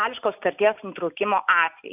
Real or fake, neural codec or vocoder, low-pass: real; none; 3.6 kHz